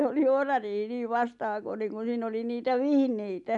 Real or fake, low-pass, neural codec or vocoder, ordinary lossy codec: real; 10.8 kHz; none; none